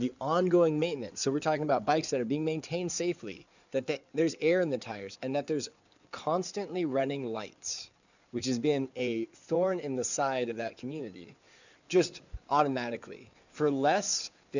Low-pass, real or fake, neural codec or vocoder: 7.2 kHz; fake; codec, 16 kHz in and 24 kHz out, 2.2 kbps, FireRedTTS-2 codec